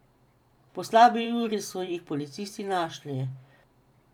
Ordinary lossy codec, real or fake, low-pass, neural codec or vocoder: none; fake; 19.8 kHz; vocoder, 44.1 kHz, 128 mel bands every 512 samples, BigVGAN v2